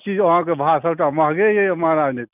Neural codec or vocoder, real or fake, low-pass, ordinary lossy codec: none; real; 3.6 kHz; none